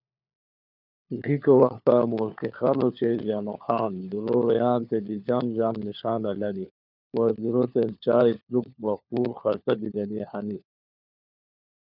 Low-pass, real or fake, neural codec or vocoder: 5.4 kHz; fake; codec, 16 kHz, 4 kbps, FunCodec, trained on LibriTTS, 50 frames a second